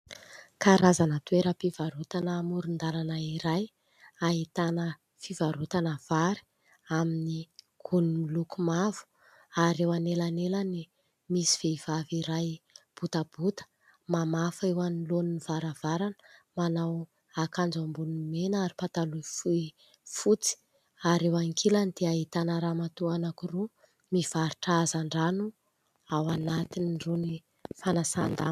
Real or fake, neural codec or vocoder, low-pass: real; none; 14.4 kHz